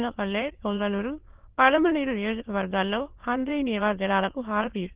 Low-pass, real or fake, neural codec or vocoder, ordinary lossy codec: 3.6 kHz; fake; autoencoder, 22.05 kHz, a latent of 192 numbers a frame, VITS, trained on many speakers; Opus, 16 kbps